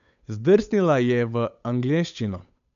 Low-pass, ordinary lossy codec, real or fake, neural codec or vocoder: 7.2 kHz; none; fake; codec, 16 kHz, 8 kbps, FunCodec, trained on Chinese and English, 25 frames a second